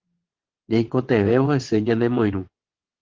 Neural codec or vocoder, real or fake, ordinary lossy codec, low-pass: vocoder, 22.05 kHz, 80 mel bands, WaveNeXt; fake; Opus, 16 kbps; 7.2 kHz